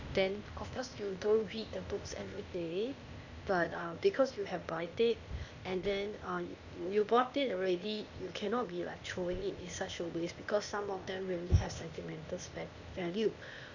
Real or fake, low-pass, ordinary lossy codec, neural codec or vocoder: fake; 7.2 kHz; none; codec, 16 kHz, 0.8 kbps, ZipCodec